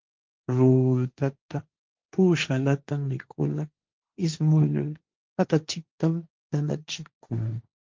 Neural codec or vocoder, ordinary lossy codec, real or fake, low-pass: codec, 16 kHz, 1.1 kbps, Voila-Tokenizer; Opus, 32 kbps; fake; 7.2 kHz